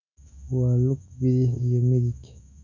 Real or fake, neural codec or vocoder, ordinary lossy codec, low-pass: fake; autoencoder, 48 kHz, 128 numbers a frame, DAC-VAE, trained on Japanese speech; none; 7.2 kHz